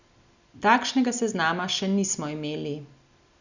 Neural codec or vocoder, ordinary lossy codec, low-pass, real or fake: none; none; 7.2 kHz; real